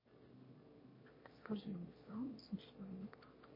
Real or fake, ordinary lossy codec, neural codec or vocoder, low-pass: fake; MP3, 24 kbps; autoencoder, 22.05 kHz, a latent of 192 numbers a frame, VITS, trained on one speaker; 5.4 kHz